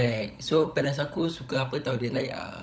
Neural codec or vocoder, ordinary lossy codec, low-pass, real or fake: codec, 16 kHz, 16 kbps, FunCodec, trained on LibriTTS, 50 frames a second; none; none; fake